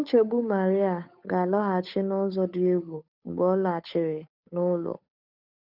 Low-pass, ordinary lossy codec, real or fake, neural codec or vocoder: 5.4 kHz; none; fake; codec, 16 kHz, 8 kbps, FunCodec, trained on Chinese and English, 25 frames a second